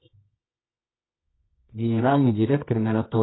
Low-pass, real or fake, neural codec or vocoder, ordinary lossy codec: 7.2 kHz; fake; codec, 24 kHz, 0.9 kbps, WavTokenizer, medium music audio release; AAC, 16 kbps